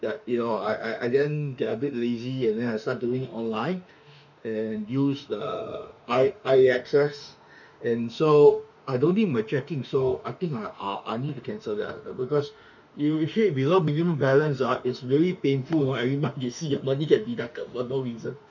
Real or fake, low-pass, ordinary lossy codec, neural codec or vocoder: fake; 7.2 kHz; none; autoencoder, 48 kHz, 32 numbers a frame, DAC-VAE, trained on Japanese speech